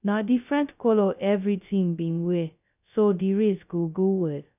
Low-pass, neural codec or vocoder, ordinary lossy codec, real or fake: 3.6 kHz; codec, 16 kHz, 0.2 kbps, FocalCodec; none; fake